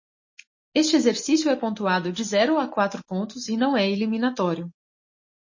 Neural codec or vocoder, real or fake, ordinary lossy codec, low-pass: none; real; MP3, 32 kbps; 7.2 kHz